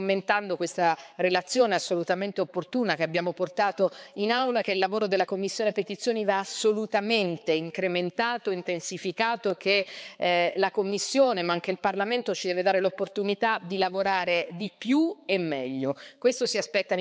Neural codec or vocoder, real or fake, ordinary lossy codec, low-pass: codec, 16 kHz, 4 kbps, X-Codec, HuBERT features, trained on balanced general audio; fake; none; none